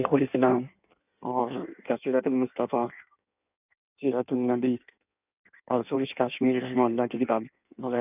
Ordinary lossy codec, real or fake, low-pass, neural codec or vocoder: none; fake; 3.6 kHz; codec, 16 kHz in and 24 kHz out, 1.1 kbps, FireRedTTS-2 codec